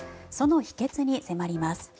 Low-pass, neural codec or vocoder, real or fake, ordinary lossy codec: none; none; real; none